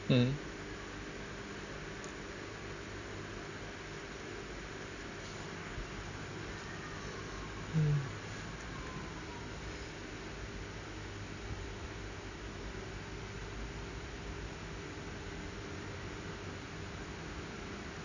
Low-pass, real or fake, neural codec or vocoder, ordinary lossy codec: 7.2 kHz; real; none; none